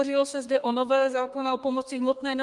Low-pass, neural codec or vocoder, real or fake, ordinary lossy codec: 10.8 kHz; codec, 32 kHz, 1.9 kbps, SNAC; fake; Opus, 32 kbps